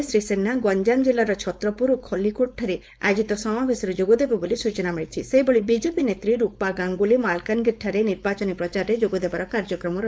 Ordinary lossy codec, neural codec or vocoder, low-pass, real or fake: none; codec, 16 kHz, 4.8 kbps, FACodec; none; fake